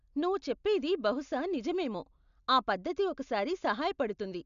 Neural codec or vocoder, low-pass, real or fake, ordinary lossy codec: none; 7.2 kHz; real; none